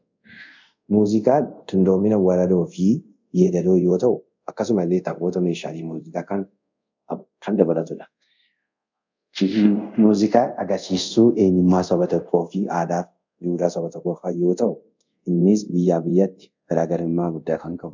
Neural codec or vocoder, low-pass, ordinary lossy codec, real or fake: codec, 24 kHz, 0.5 kbps, DualCodec; 7.2 kHz; MP3, 64 kbps; fake